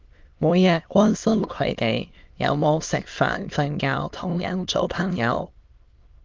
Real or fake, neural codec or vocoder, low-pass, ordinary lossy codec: fake; autoencoder, 22.05 kHz, a latent of 192 numbers a frame, VITS, trained on many speakers; 7.2 kHz; Opus, 32 kbps